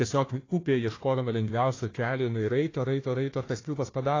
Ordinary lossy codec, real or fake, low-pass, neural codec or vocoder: AAC, 32 kbps; fake; 7.2 kHz; codec, 16 kHz, 1 kbps, FunCodec, trained on Chinese and English, 50 frames a second